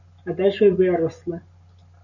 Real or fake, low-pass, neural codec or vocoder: real; 7.2 kHz; none